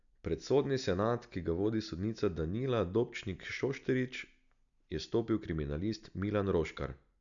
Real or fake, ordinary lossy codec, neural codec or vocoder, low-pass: real; none; none; 7.2 kHz